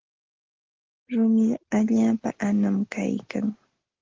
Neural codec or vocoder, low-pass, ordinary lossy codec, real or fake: none; 7.2 kHz; Opus, 16 kbps; real